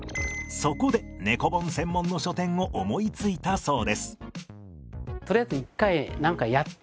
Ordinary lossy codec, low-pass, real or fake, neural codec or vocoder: none; none; real; none